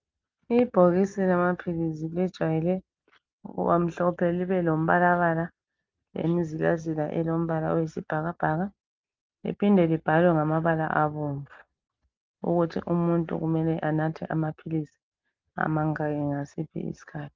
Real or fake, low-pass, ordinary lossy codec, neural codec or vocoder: real; 7.2 kHz; Opus, 32 kbps; none